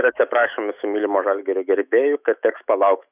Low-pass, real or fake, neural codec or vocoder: 3.6 kHz; fake; autoencoder, 48 kHz, 128 numbers a frame, DAC-VAE, trained on Japanese speech